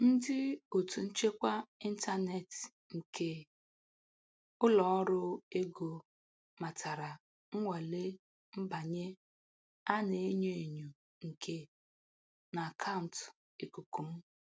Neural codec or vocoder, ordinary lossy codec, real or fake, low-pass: none; none; real; none